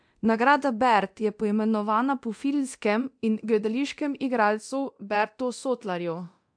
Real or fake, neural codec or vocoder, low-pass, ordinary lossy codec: fake; codec, 24 kHz, 0.9 kbps, DualCodec; 9.9 kHz; MP3, 64 kbps